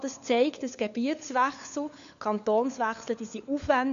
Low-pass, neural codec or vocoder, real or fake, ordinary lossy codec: 7.2 kHz; codec, 16 kHz, 4 kbps, FunCodec, trained on LibriTTS, 50 frames a second; fake; AAC, 96 kbps